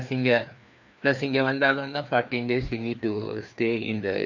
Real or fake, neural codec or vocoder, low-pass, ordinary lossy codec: fake; codec, 16 kHz, 2 kbps, FreqCodec, larger model; 7.2 kHz; none